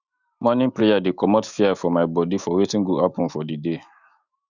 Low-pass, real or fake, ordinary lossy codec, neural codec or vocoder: 7.2 kHz; fake; Opus, 64 kbps; autoencoder, 48 kHz, 128 numbers a frame, DAC-VAE, trained on Japanese speech